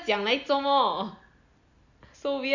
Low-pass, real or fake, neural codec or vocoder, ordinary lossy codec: 7.2 kHz; real; none; none